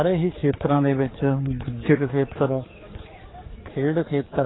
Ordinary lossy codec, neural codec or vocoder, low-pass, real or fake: AAC, 16 kbps; codec, 16 kHz, 4 kbps, X-Codec, HuBERT features, trained on general audio; 7.2 kHz; fake